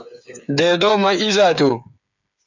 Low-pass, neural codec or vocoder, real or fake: 7.2 kHz; codec, 16 kHz, 8 kbps, FreqCodec, smaller model; fake